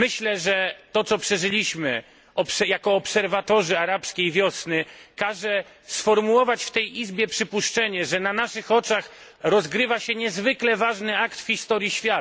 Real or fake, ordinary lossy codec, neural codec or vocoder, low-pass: real; none; none; none